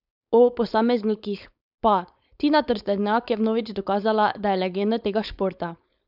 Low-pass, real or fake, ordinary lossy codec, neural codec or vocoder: 5.4 kHz; fake; none; codec, 16 kHz, 4.8 kbps, FACodec